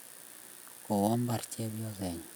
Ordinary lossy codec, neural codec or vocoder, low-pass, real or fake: none; none; none; real